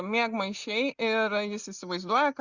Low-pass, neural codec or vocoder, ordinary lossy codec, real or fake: 7.2 kHz; none; Opus, 64 kbps; real